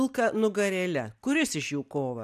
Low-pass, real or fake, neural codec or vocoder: 14.4 kHz; real; none